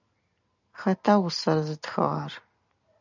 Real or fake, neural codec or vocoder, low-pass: real; none; 7.2 kHz